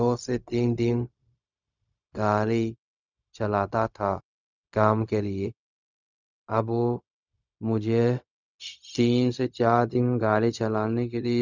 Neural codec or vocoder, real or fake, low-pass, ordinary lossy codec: codec, 16 kHz, 0.4 kbps, LongCat-Audio-Codec; fake; 7.2 kHz; none